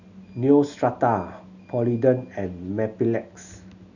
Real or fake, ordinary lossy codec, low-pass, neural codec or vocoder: real; none; 7.2 kHz; none